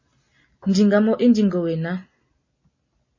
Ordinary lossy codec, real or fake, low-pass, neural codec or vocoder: MP3, 32 kbps; real; 7.2 kHz; none